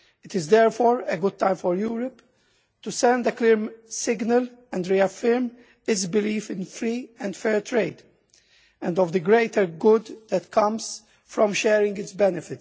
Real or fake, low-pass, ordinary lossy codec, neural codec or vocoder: real; none; none; none